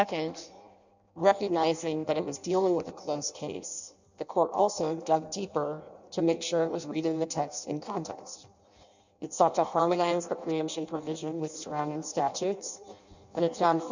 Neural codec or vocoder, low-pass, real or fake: codec, 16 kHz in and 24 kHz out, 0.6 kbps, FireRedTTS-2 codec; 7.2 kHz; fake